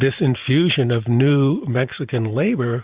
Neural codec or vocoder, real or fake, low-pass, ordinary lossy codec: vocoder, 44.1 kHz, 128 mel bands every 512 samples, BigVGAN v2; fake; 3.6 kHz; Opus, 64 kbps